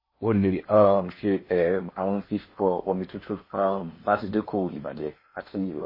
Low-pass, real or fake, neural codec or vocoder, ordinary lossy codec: 5.4 kHz; fake; codec, 16 kHz in and 24 kHz out, 0.8 kbps, FocalCodec, streaming, 65536 codes; MP3, 24 kbps